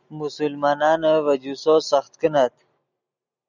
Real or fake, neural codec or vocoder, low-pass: real; none; 7.2 kHz